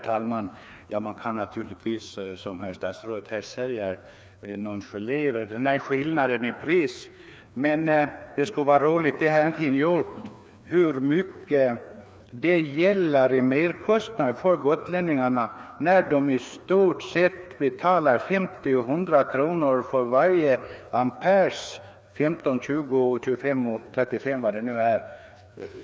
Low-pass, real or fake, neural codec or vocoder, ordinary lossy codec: none; fake; codec, 16 kHz, 2 kbps, FreqCodec, larger model; none